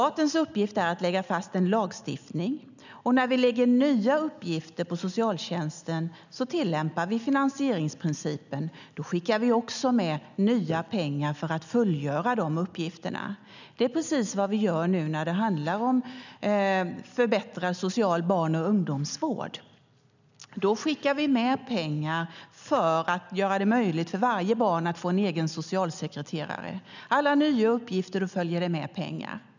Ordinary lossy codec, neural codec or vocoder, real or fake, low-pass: none; none; real; 7.2 kHz